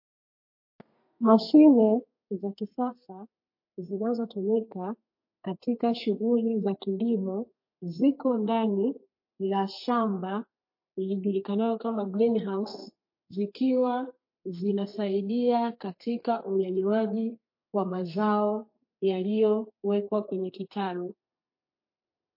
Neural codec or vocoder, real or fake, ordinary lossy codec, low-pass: codec, 32 kHz, 1.9 kbps, SNAC; fake; MP3, 32 kbps; 5.4 kHz